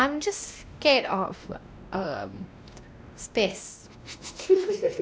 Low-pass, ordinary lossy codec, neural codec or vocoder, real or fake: none; none; codec, 16 kHz, 1 kbps, X-Codec, WavLM features, trained on Multilingual LibriSpeech; fake